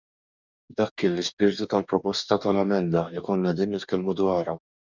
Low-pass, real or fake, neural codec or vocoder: 7.2 kHz; fake; codec, 44.1 kHz, 2.6 kbps, DAC